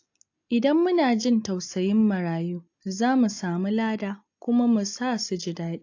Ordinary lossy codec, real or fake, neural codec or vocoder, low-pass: AAC, 48 kbps; real; none; 7.2 kHz